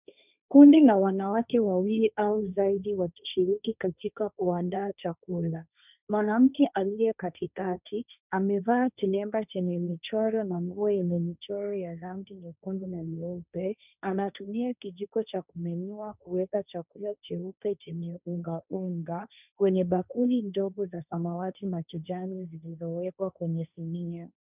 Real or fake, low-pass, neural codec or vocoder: fake; 3.6 kHz; codec, 16 kHz, 1.1 kbps, Voila-Tokenizer